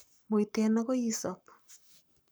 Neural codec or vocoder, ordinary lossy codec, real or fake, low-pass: codec, 44.1 kHz, 7.8 kbps, Pupu-Codec; none; fake; none